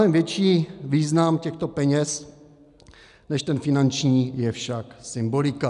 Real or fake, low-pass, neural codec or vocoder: real; 10.8 kHz; none